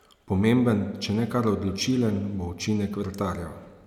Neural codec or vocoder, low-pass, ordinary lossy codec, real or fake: none; 19.8 kHz; none; real